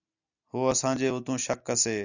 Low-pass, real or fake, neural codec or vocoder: 7.2 kHz; real; none